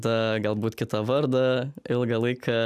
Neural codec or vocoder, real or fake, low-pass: none; real; 14.4 kHz